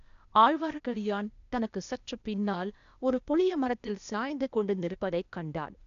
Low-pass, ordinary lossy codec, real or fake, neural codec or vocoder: 7.2 kHz; none; fake; codec, 16 kHz, 0.8 kbps, ZipCodec